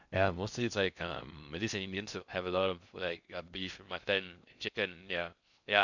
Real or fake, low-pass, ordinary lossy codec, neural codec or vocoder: fake; 7.2 kHz; none; codec, 16 kHz in and 24 kHz out, 0.6 kbps, FocalCodec, streaming, 2048 codes